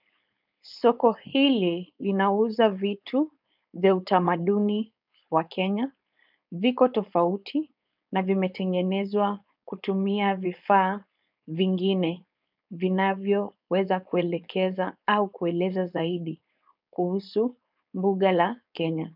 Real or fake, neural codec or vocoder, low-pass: fake; codec, 16 kHz, 4.8 kbps, FACodec; 5.4 kHz